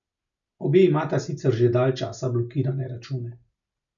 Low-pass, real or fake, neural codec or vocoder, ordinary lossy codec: 7.2 kHz; real; none; none